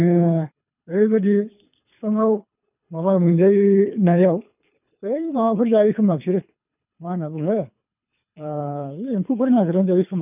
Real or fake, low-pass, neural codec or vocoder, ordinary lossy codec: fake; 3.6 kHz; codec, 24 kHz, 3 kbps, HILCodec; none